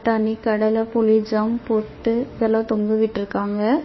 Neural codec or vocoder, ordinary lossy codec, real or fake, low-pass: autoencoder, 48 kHz, 32 numbers a frame, DAC-VAE, trained on Japanese speech; MP3, 24 kbps; fake; 7.2 kHz